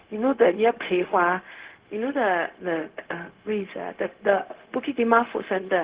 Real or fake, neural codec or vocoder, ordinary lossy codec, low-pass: fake; codec, 16 kHz, 0.4 kbps, LongCat-Audio-Codec; Opus, 16 kbps; 3.6 kHz